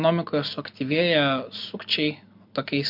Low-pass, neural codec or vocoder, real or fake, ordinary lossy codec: 5.4 kHz; none; real; MP3, 48 kbps